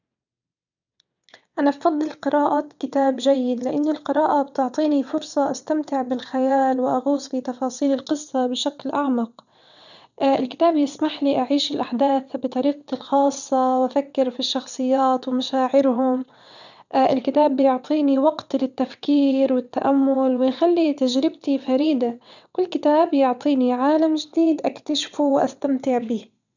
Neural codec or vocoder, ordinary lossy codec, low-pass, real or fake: vocoder, 22.05 kHz, 80 mel bands, WaveNeXt; none; 7.2 kHz; fake